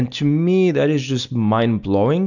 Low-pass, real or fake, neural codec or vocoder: 7.2 kHz; real; none